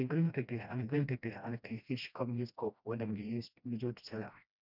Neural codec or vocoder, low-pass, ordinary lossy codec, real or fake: codec, 16 kHz, 1 kbps, FreqCodec, smaller model; 5.4 kHz; none; fake